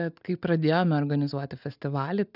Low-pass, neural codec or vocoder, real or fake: 5.4 kHz; none; real